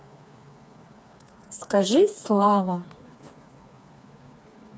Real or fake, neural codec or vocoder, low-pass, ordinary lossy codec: fake; codec, 16 kHz, 4 kbps, FreqCodec, smaller model; none; none